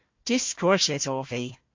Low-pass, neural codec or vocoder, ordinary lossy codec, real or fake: 7.2 kHz; codec, 16 kHz, 1 kbps, FunCodec, trained on Chinese and English, 50 frames a second; MP3, 48 kbps; fake